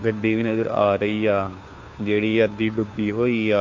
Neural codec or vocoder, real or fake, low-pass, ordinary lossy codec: codec, 16 kHz, 2 kbps, FunCodec, trained on Chinese and English, 25 frames a second; fake; 7.2 kHz; AAC, 48 kbps